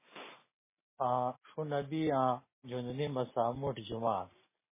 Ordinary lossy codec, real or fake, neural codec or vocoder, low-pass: MP3, 16 kbps; real; none; 3.6 kHz